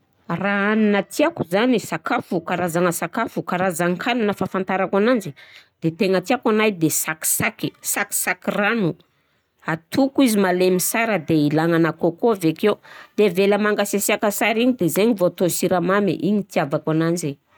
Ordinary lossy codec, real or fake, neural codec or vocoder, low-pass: none; real; none; none